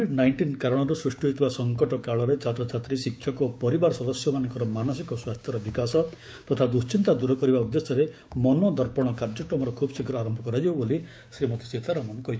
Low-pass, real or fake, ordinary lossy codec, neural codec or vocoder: none; fake; none; codec, 16 kHz, 6 kbps, DAC